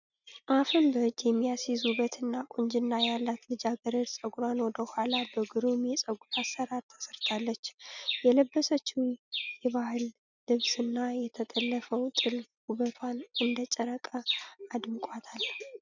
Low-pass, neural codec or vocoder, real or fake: 7.2 kHz; none; real